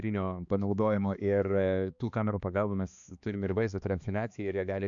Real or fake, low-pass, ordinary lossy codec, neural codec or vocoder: fake; 7.2 kHz; MP3, 96 kbps; codec, 16 kHz, 2 kbps, X-Codec, HuBERT features, trained on balanced general audio